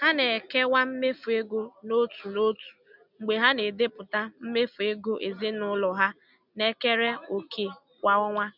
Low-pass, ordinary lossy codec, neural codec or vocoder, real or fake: 5.4 kHz; none; none; real